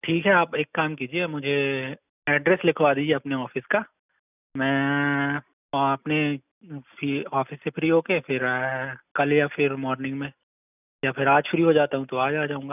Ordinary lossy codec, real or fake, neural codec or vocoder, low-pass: none; real; none; 3.6 kHz